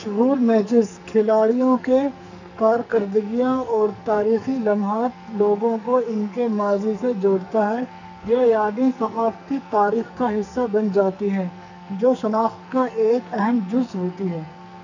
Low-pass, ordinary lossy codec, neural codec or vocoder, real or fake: 7.2 kHz; none; codec, 44.1 kHz, 2.6 kbps, SNAC; fake